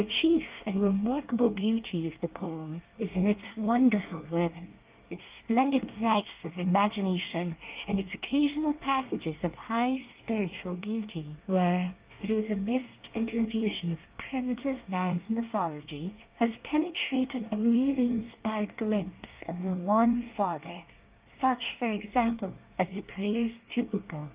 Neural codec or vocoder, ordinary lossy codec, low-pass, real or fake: codec, 24 kHz, 1 kbps, SNAC; Opus, 32 kbps; 3.6 kHz; fake